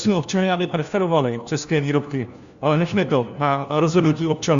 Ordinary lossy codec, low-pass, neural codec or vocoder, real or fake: Opus, 64 kbps; 7.2 kHz; codec, 16 kHz, 1 kbps, FunCodec, trained on LibriTTS, 50 frames a second; fake